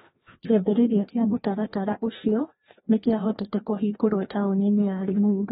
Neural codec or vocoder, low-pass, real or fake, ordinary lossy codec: codec, 16 kHz, 1 kbps, FreqCodec, larger model; 7.2 kHz; fake; AAC, 16 kbps